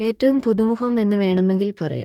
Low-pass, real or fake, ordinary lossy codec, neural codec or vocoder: 19.8 kHz; fake; none; codec, 44.1 kHz, 2.6 kbps, DAC